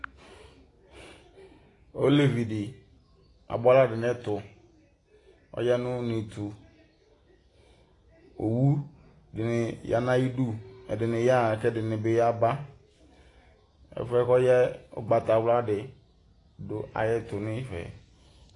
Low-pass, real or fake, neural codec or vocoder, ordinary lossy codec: 10.8 kHz; real; none; AAC, 32 kbps